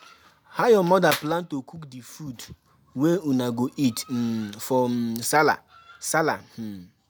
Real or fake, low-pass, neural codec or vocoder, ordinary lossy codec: real; none; none; none